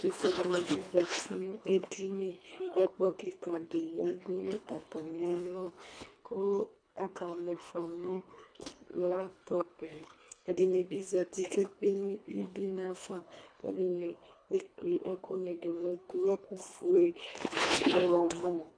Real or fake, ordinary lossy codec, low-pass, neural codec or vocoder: fake; AAC, 64 kbps; 9.9 kHz; codec, 24 kHz, 1.5 kbps, HILCodec